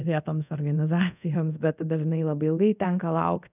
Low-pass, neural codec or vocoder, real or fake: 3.6 kHz; codec, 24 kHz, 0.5 kbps, DualCodec; fake